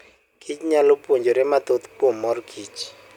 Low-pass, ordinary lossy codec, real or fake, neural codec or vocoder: 19.8 kHz; none; real; none